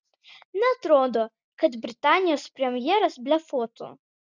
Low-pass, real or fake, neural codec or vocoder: 7.2 kHz; real; none